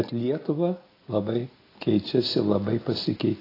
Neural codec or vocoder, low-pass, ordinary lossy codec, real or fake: none; 5.4 kHz; AAC, 24 kbps; real